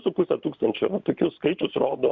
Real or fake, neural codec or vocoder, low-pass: fake; vocoder, 44.1 kHz, 128 mel bands every 512 samples, BigVGAN v2; 7.2 kHz